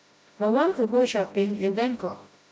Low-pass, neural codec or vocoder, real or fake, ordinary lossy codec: none; codec, 16 kHz, 0.5 kbps, FreqCodec, smaller model; fake; none